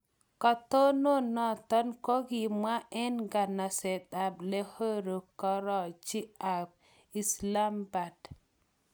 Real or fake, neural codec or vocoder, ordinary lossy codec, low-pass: real; none; none; none